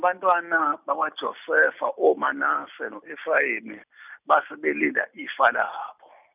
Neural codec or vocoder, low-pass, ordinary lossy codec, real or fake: none; 3.6 kHz; none; real